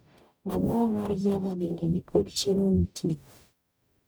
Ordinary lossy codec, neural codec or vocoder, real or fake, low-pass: none; codec, 44.1 kHz, 0.9 kbps, DAC; fake; none